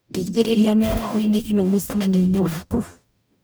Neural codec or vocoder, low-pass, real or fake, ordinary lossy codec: codec, 44.1 kHz, 0.9 kbps, DAC; none; fake; none